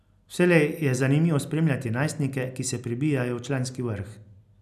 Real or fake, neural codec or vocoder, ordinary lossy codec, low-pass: real; none; none; 14.4 kHz